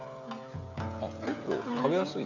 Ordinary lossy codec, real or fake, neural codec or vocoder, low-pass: none; fake; codec, 16 kHz, 16 kbps, FreqCodec, smaller model; 7.2 kHz